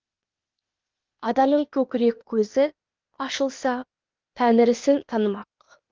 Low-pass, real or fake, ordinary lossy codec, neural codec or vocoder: 7.2 kHz; fake; Opus, 32 kbps; codec, 16 kHz, 0.8 kbps, ZipCodec